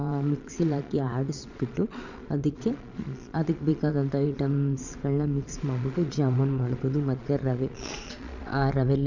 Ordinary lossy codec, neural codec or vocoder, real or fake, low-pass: none; vocoder, 22.05 kHz, 80 mel bands, Vocos; fake; 7.2 kHz